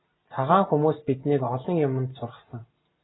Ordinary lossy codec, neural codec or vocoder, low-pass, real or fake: AAC, 16 kbps; none; 7.2 kHz; real